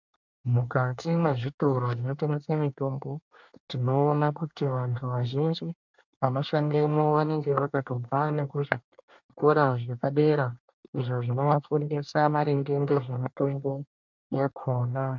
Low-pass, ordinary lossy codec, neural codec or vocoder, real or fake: 7.2 kHz; MP3, 64 kbps; codec, 24 kHz, 1 kbps, SNAC; fake